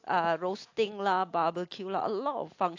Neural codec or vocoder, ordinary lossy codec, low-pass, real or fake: none; AAC, 48 kbps; 7.2 kHz; real